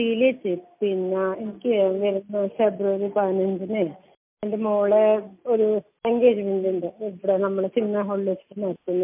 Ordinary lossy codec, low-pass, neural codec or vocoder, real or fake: MP3, 32 kbps; 3.6 kHz; none; real